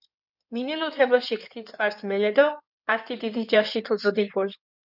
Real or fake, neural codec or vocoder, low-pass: fake; codec, 16 kHz in and 24 kHz out, 2.2 kbps, FireRedTTS-2 codec; 5.4 kHz